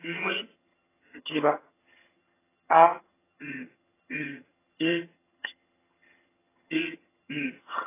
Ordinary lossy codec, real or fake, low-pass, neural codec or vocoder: AAC, 16 kbps; fake; 3.6 kHz; vocoder, 22.05 kHz, 80 mel bands, HiFi-GAN